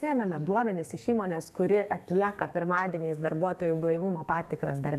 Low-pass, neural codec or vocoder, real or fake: 14.4 kHz; codec, 32 kHz, 1.9 kbps, SNAC; fake